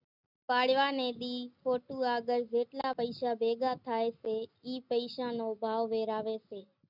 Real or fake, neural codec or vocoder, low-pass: real; none; 5.4 kHz